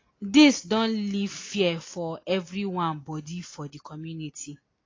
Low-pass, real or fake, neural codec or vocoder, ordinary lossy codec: 7.2 kHz; real; none; AAC, 32 kbps